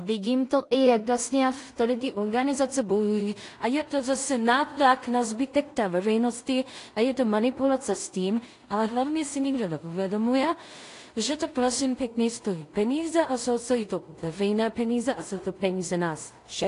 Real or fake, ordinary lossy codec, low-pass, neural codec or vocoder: fake; AAC, 48 kbps; 10.8 kHz; codec, 16 kHz in and 24 kHz out, 0.4 kbps, LongCat-Audio-Codec, two codebook decoder